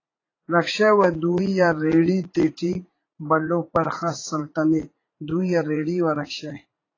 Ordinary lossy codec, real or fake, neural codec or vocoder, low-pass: AAC, 32 kbps; fake; vocoder, 44.1 kHz, 80 mel bands, Vocos; 7.2 kHz